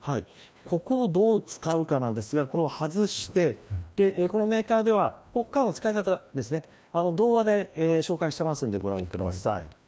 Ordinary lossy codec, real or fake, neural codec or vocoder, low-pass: none; fake; codec, 16 kHz, 1 kbps, FreqCodec, larger model; none